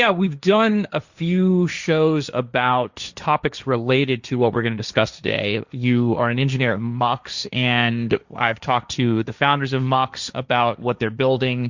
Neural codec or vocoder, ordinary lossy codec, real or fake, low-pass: codec, 16 kHz, 1.1 kbps, Voila-Tokenizer; Opus, 64 kbps; fake; 7.2 kHz